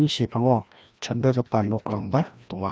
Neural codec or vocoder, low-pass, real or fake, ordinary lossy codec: codec, 16 kHz, 1 kbps, FreqCodec, larger model; none; fake; none